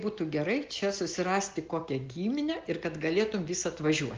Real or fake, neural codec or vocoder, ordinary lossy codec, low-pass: real; none; Opus, 32 kbps; 7.2 kHz